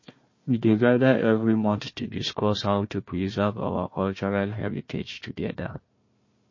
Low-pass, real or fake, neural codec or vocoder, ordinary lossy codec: 7.2 kHz; fake; codec, 16 kHz, 1 kbps, FunCodec, trained on Chinese and English, 50 frames a second; MP3, 32 kbps